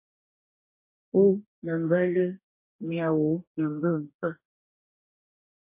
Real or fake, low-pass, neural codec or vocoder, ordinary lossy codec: fake; 3.6 kHz; codec, 44.1 kHz, 2.6 kbps, DAC; MP3, 24 kbps